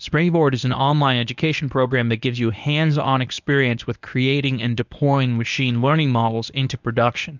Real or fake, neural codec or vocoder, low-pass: fake; codec, 24 kHz, 0.9 kbps, WavTokenizer, medium speech release version 1; 7.2 kHz